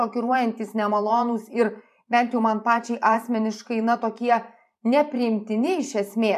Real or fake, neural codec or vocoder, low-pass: fake; vocoder, 44.1 kHz, 128 mel bands every 512 samples, BigVGAN v2; 14.4 kHz